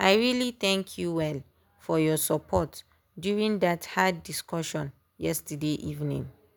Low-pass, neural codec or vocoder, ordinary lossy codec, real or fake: none; none; none; real